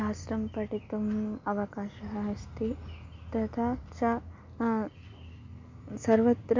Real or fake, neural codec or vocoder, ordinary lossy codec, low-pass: real; none; MP3, 48 kbps; 7.2 kHz